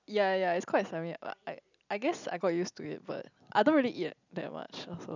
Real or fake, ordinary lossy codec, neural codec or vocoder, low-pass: real; none; none; 7.2 kHz